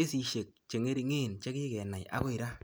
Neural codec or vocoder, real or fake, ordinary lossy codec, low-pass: none; real; none; none